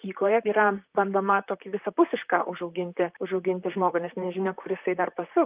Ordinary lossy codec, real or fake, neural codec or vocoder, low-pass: Opus, 24 kbps; fake; vocoder, 44.1 kHz, 128 mel bands, Pupu-Vocoder; 3.6 kHz